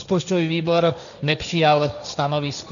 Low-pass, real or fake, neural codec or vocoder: 7.2 kHz; fake; codec, 16 kHz, 1.1 kbps, Voila-Tokenizer